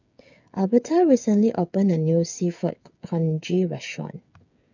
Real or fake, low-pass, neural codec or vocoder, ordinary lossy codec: fake; 7.2 kHz; codec, 16 kHz, 8 kbps, FreqCodec, smaller model; none